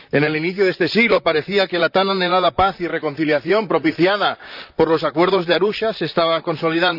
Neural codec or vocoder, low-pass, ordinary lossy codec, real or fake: vocoder, 44.1 kHz, 128 mel bands, Pupu-Vocoder; 5.4 kHz; none; fake